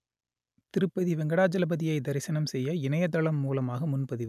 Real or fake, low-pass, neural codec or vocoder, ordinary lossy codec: real; 14.4 kHz; none; none